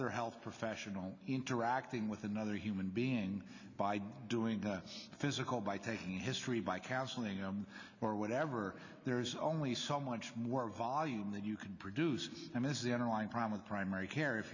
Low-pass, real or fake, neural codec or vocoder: 7.2 kHz; real; none